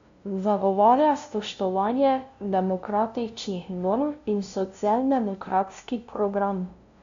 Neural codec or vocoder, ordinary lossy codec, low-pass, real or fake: codec, 16 kHz, 0.5 kbps, FunCodec, trained on LibriTTS, 25 frames a second; MP3, 64 kbps; 7.2 kHz; fake